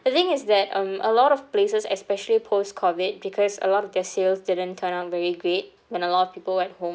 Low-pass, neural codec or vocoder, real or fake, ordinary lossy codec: none; none; real; none